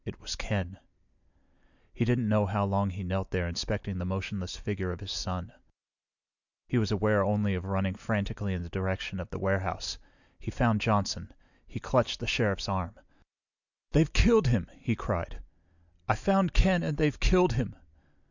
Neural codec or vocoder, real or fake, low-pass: none; real; 7.2 kHz